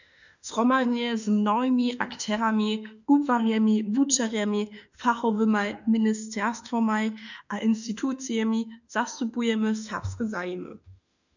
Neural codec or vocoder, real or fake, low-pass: autoencoder, 48 kHz, 32 numbers a frame, DAC-VAE, trained on Japanese speech; fake; 7.2 kHz